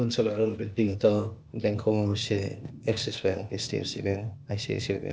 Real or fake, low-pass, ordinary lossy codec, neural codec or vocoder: fake; none; none; codec, 16 kHz, 0.8 kbps, ZipCodec